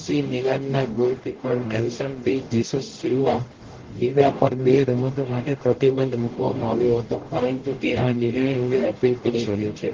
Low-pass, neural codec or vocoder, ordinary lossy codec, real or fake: 7.2 kHz; codec, 44.1 kHz, 0.9 kbps, DAC; Opus, 16 kbps; fake